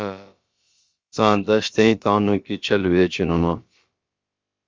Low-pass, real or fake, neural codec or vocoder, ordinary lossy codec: 7.2 kHz; fake; codec, 16 kHz, about 1 kbps, DyCAST, with the encoder's durations; Opus, 32 kbps